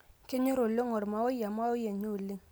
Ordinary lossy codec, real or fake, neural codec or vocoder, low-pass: none; real; none; none